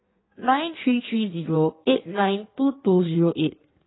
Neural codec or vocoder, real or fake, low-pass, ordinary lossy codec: codec, 16 kHz in and 24 kHz out, 1.1 kbps, FireRedTTS-2 codec; fake; 7.2 kHz; AAC, 16 kbps